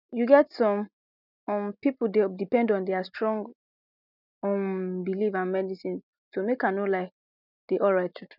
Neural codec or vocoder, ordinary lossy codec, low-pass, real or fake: none; none; 5.4 kHz; real